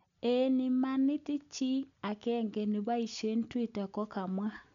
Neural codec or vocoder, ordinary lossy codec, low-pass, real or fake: none; none; 7.2 kHz; real